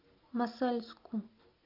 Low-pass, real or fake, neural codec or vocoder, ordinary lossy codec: 5.4 kHz; real; none; AAC, 48 kbps